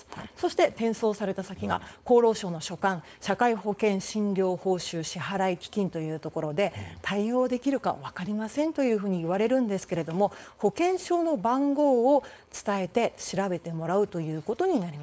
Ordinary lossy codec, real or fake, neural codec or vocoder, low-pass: none; fake; codec, 16 kHz, 4.8 kbps, FACodec; none